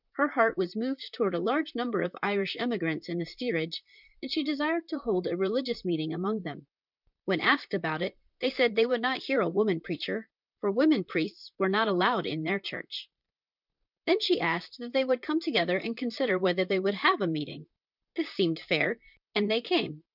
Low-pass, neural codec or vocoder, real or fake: 5.4 kHz; vocoder, 44.1 kHz, 128 mel bands, Pupu-Vocoder; fake